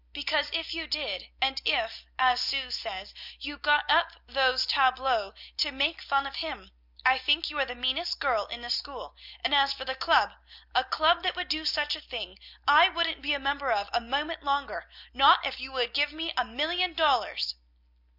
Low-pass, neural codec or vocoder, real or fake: 5.4 kHz; none; real